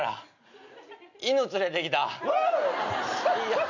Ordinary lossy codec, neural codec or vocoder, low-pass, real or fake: none; none; 7.2 kHz; real